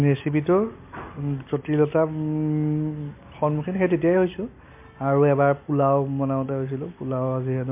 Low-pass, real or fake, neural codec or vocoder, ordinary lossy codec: 3.6 kHz; real; none; MP3, 24 kbps